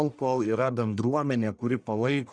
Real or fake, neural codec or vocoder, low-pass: fake; codec, 44.1 kHz, 1.7 kbps, Pupu-Codec; 9.9 kHz